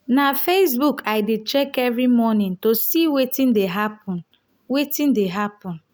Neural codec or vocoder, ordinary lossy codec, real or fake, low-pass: none; none; real; none